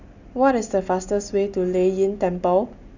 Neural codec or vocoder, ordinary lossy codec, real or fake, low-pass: none; none; real; 7.2 kHz